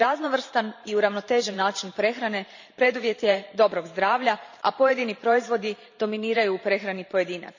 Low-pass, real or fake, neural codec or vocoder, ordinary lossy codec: 7.2 kHz; fake; vocoder, 44.1 kHz, 128 mel bands every 512 samples, BigVGAN v2; none